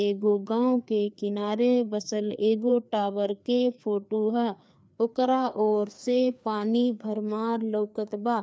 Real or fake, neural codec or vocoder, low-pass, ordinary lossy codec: fake; codec, 16 kHz, 2 kbps, FreqCodec, larger model; none; none